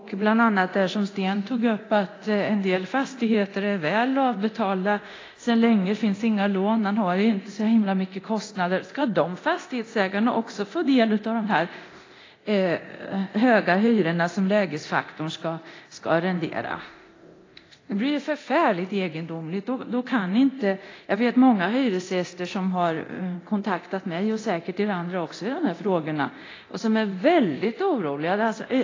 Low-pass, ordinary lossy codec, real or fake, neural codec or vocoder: 7.2 kHz; AAC, 32 kbps; fake; codec, 24 kHz, 0.9 kbps, DualCodec